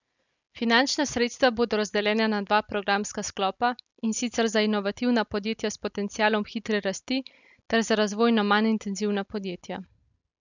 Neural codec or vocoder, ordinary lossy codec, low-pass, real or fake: none; none; 7.2 kHz; real